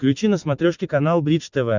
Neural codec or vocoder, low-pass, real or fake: none; 7.2 kHz; real